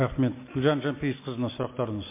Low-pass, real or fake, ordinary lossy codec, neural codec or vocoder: 3.6 kHz; real; MP3, 24 kbps; none